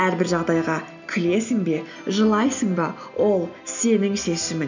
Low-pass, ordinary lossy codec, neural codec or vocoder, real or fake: 7.2 kHz; none; none; real